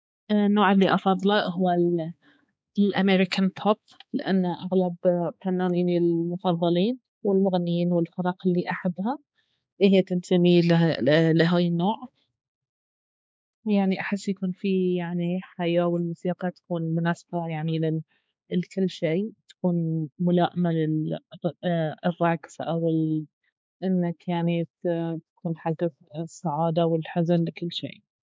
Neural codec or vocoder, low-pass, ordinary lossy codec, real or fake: codec, 16 kHz, 4 kbps, X-Codec, HuBERT features, trained on balanced general audio; none; none; fake